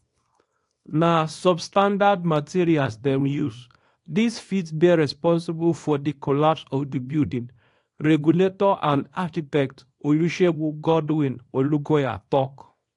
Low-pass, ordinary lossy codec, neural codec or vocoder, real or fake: 10.8 kHz; AAC, 48 kbps; codec, 24 kHz, 0.9 kbps, WavTokenizer, small release; fake